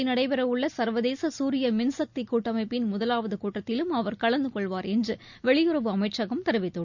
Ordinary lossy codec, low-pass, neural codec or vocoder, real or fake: none; 7.2 kHz; none; real